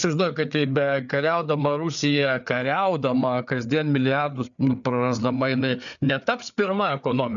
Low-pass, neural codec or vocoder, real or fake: 7.2 kHz; codec, 16 kHz, 4 kbps, FreqCodec, larger model; fake